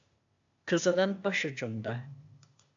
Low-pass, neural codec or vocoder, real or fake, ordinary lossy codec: 7.2 kHz; codec, 16 kHz, 0.8 kbps, ZipCodec; fake; AAC, 64 kbps